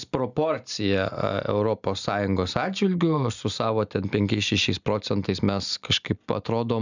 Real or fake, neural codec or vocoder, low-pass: real; none; 7.2 kHz